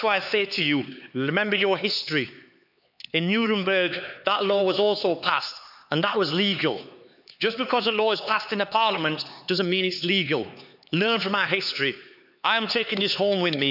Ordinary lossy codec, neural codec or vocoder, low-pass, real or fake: none; codec, 16 kHz, 4 kbps, X-Codec, HuBERT features, trained on LibriSpeech; 5.4 kHz; fake